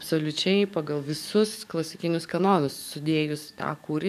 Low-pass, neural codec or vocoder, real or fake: 14.4 kHz; codec, 44.1 kHz, 7.8 kbps, DAC; fake